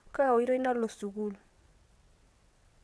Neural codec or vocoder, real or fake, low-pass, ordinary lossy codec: vocoder, 22.05 kHz, 80 mel bands, WaveNeXt; fake; none; none